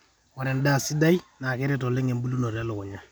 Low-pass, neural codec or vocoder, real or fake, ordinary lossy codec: none; none; real; none